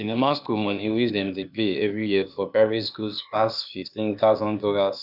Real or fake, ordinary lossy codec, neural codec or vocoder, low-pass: fake; none; codec, 16 kHz, 0.8 kbps, ZipCodec; 5.4 kHz